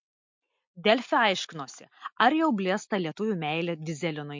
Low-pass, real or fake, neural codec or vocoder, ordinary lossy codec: 7.2 kHz; real; none; MP3, 48 kbps